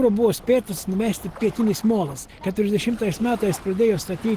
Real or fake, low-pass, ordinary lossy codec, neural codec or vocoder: real; 14.4 kHz; Opus, 16 kbps; none